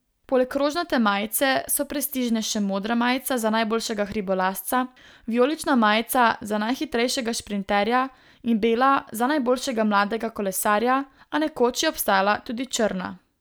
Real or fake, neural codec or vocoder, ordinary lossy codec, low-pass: real; none; none; none